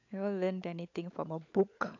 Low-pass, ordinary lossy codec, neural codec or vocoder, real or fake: 7.2 kHz; AAC, 48 kbps; codec, 16 kHz, 16 kbps, FunCodec, trained on LibriTTS, 50 frames a second; fake